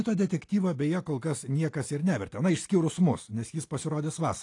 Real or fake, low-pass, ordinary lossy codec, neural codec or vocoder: real; 10.8 kHz; AAC, 48 kbps; none